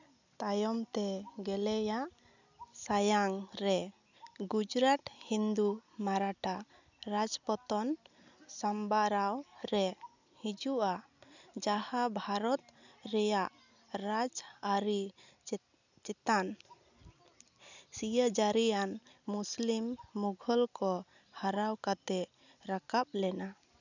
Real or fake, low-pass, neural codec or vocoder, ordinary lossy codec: real; 7.2 kHz; none; none